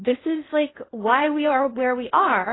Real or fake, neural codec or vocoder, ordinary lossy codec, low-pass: fake; codec, 16 kHz in and 24 kHz out, 0.8 kbps, FocalCodec, streaming, 65536 codes; AAC, 16 kbps; 7.2 kHz